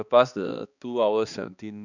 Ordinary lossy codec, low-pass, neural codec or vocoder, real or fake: none; 7.2 kHz; codec, 16 kHz, 2 kbps, X-Codec, HuBERT features, trained on balanced general audio; fake